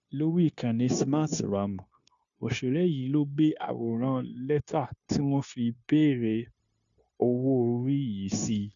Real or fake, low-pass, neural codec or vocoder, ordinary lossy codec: fake; 7.2 kHz; codec, 16 kHz, 0.9 kbps, LongCat-Audio-Codec; none